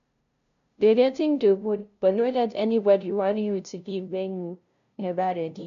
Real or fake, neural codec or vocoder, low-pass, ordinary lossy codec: fake; codec, 16 kHz, 0.5 kbps, FunCodec, trained on LibriTTS, 25 frames a second; 7.2 kHz; MP3, 96 kbps